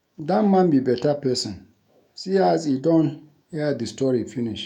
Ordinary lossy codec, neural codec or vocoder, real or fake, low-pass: none; autoencoder, 48 kHz, 128 numbers a frame, DAC-VAE, trained on Japanese speech; fake; 19.8 kHz